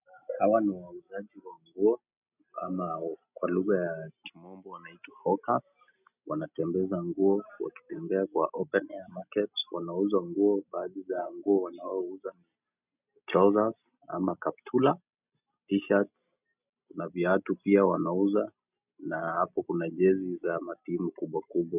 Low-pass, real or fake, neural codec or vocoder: 3.6 kHz; real; none